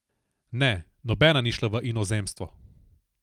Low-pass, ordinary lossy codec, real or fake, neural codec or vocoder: 19.8 kHz; Opus, 32 kbps; real; none